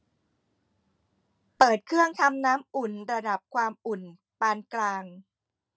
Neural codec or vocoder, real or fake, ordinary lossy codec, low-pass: none; real; none; none